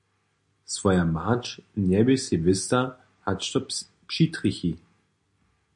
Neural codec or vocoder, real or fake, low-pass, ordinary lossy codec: none; real; 10.8 kHz; MP3, 48 kbps